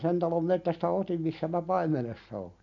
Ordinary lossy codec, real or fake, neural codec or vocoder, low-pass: none; real; none; 7.2 kHz